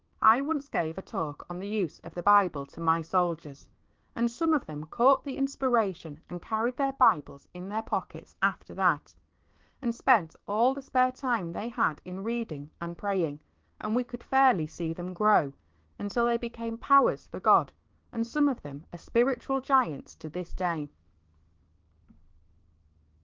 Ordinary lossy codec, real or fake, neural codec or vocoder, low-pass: Opus, 16 kbps; fake; codec, 16 kHz, 6 kbps, DAC; 7.2 kHz